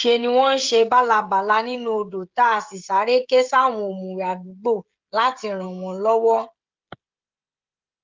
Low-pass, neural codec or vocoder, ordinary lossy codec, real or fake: 7.2 kHz; codec, 16 kHz, 16 kbps, FreqCodec, smaller model; Opus, 32 kbps; fake